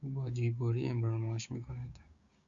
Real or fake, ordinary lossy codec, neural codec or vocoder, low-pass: fake; Opus, 64 kbps; codec, 16 kHz, 6 kbps, DAC; 7.2 kHz